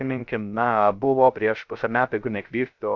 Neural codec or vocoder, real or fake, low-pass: codec, 16 kHz, 0.3 kbps, FocalCodec; fake; 7.2 kHz